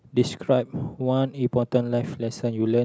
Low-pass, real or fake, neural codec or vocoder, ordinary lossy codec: none; real; none; none